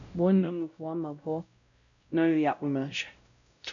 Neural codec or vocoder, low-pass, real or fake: codec, 16 kHz, 0.5 kbps, X-Codec, WavLM features, trained on Multilingual LibriSpeech; 7.2 kHz; fake